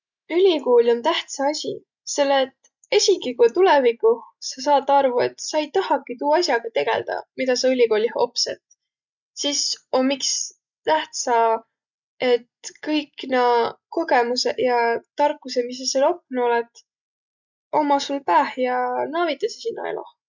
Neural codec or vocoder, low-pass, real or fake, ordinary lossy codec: none; 7.2 kHz; real; none